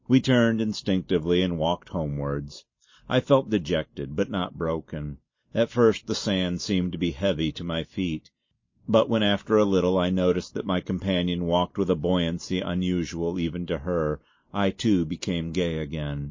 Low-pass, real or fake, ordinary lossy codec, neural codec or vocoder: 7.2 kHz; real; MP3, 32 kbps; none